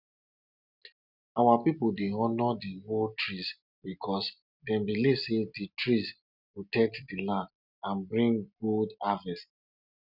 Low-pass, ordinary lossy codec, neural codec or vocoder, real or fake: 5.4 kHz; none; none; real